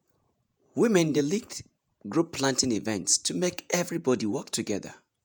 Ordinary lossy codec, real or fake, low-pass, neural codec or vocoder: none; fake; none; vocoder, 48 kHz, 128 mel bands, Vocos